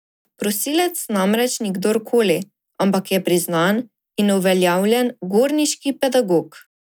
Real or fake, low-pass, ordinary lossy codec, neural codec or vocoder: real; none; none; none